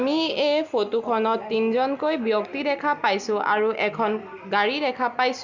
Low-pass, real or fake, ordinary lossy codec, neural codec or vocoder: 7.2 kHz; real; Opus, 64 kbps; none